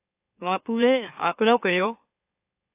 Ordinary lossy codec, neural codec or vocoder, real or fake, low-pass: AAC, 32 kbps; autoencoder, 44.1 kHz, a latent of 192 numbers a frame, MeloTTS; fake; 3.6 kHz